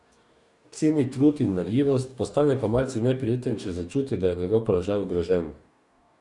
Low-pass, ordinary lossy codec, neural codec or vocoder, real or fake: 10.8 kHz; none; codec, 44.1 kHz, 2.6 kbps, DAC; fake